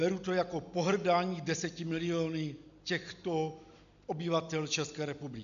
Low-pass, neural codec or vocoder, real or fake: 7.2 kHz; none; real